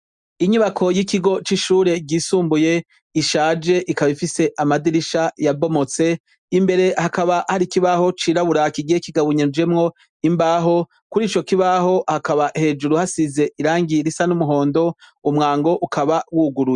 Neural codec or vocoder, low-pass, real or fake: none; 10.8 kHz; real